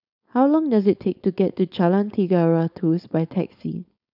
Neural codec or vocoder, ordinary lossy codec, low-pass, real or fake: codec, 16 kHz, 4.8 kbps, FACodec; none; 5.4 kHz; fake